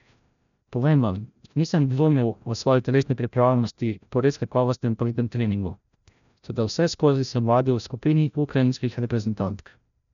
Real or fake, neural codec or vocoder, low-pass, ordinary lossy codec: fake; codec, 16 kHz, 0.5 kbps, FreqCodec, larger model; 7.2 kHz; none